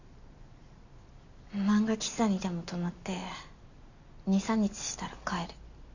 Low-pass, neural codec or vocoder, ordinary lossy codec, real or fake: 7.2 kHz; none; none; real